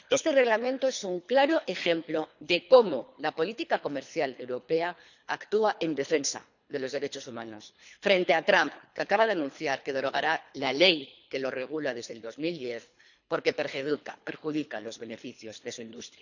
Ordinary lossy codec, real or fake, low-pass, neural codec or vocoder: none; fake; 7.2 kHz; codec, 24 kHz, 3 kbps, HILCodec